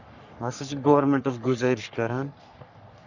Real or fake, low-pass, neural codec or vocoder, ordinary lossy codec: fake; 7.2 kHz; codec, 44.1 kHz, 3.4 kbps, Pupu-Codec; none